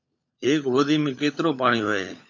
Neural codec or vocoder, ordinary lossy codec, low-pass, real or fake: codec, 16 kHz, 16 kbps, FunCodec, trained on LibriTTS, 50 frames a second; AAC, 48 kbps; 7.2 kHz; fake